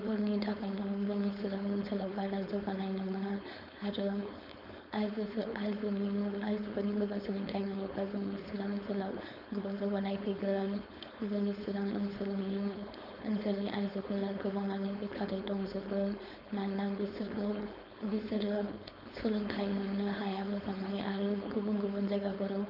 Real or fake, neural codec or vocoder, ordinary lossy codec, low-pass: fake; codec, 16 kHz, 4.8 kbps, FACodec; none; 5.4 kHz